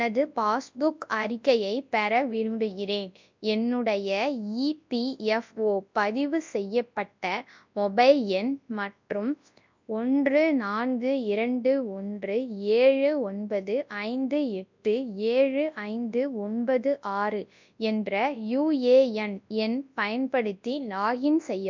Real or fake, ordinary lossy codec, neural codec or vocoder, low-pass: fake; MP3, 64 kbps; codec, 24 kHz, 0.9 kbps, WavTokenizer, large speech release; 7.2 kHz